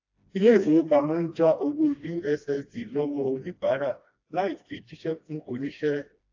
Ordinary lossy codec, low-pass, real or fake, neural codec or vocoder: AAC, 48 kbps; 7.2 kHz; fake; codec, 16 kHz, 1 kbps, FreqCodec, smaller model